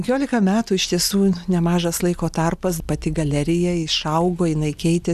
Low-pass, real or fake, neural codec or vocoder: 14.4 kHz; real; none